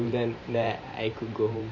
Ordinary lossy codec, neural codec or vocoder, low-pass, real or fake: MP3, 32 kbps; vocoder, 44.1 kHz, 128 mel bands every 512 samples, BigVGAN v2; 7.2 kHz; fake